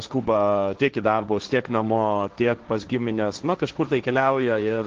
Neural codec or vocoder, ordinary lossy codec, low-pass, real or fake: codec, 16 kHz, 1.1 kbps, Voila-Tokenizer; Opus, 16 kbps; 7.2 kHz; fake